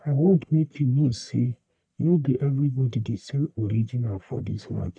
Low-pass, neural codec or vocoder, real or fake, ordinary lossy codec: 9.9 kHz; codec, 44.1 kHz, 1.7 kbps, Pupu-Codec; fake; none